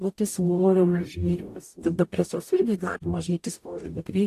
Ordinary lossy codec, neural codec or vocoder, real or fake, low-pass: AAC, 64 kbps; codec, 44.1 kHz, 0.9 kbps, DAC; fake; 14.4 kHz